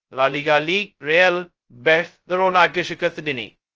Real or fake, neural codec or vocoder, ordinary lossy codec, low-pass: fake; codec, 16 kHz, 0.2 kbps, FocalCodec; Opus, 24 kbps; 7.2 kHz